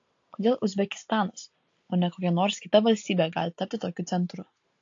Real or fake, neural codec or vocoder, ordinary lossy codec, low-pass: real; none; AAC, 48 kbps; 7.2 kHz